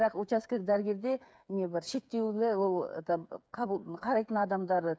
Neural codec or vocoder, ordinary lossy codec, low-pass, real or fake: codec, 16 kHz, 8 kbps, FreqCodec, smaller model; none; none; fake